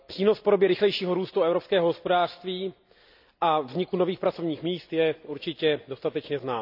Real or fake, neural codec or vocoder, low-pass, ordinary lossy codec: real; none; 5.4 kHz; none